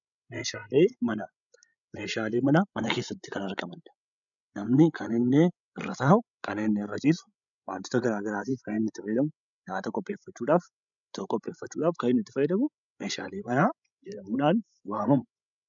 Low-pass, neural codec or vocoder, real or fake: 7.2 kHz; codec, 16 kHz, 16 kbps, FreqCodec, larger model; fake